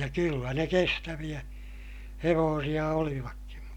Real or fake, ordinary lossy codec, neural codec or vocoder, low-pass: real; none; none; 19.8 kHz